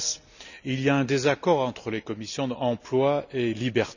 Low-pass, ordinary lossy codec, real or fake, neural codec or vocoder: 7.2 kHz; none; real; none